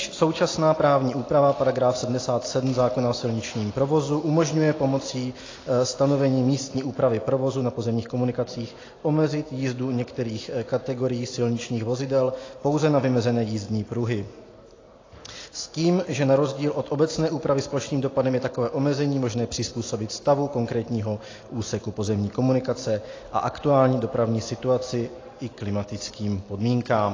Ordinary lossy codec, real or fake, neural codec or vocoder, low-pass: AAC, 32 kbps; real; none; 7.2 kHz